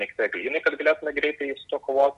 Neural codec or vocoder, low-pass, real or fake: none; 10.8 kHz; real